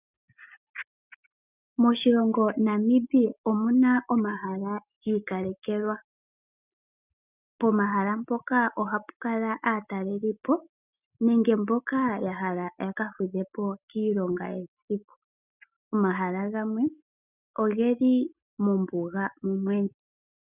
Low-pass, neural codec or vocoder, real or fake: 3.6 kHz; none; real